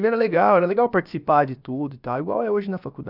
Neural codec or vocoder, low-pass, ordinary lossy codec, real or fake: codec, 16 kHz, about 1 kbps, DyCAST, with the encoder's durations; 5.4 kHz; none; fake